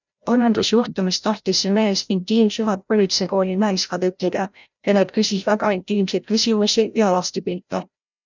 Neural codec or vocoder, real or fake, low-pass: codec, 16 kHz, 0.5 kbps, FreqCodec, larger model; fake; 7.2 kHz